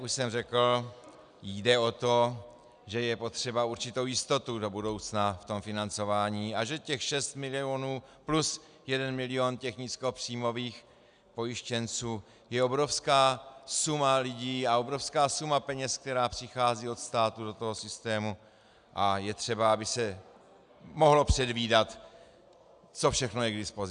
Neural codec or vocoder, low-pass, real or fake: none; 9.9 kHz; real